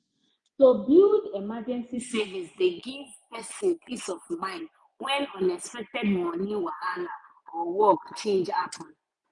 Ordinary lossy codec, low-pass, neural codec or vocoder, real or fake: none; 10.8 kHz; vocoder, 24 kHz, 100 mel bands, Vocos; fake